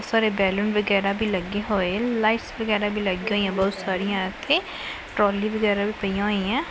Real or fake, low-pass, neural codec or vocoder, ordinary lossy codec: real; none; none; none